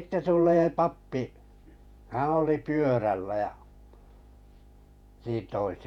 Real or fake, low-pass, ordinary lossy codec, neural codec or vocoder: fake; 19.8 kHz; none; vocoder, 48 kHz, 128 mel bands, Vocos